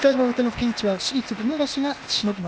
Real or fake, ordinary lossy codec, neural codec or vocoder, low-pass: fake; none; codec, 16 kHz, 0.8 kbps, ZipCodec; none